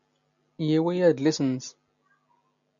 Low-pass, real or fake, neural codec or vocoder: 7.2 kHz; real; none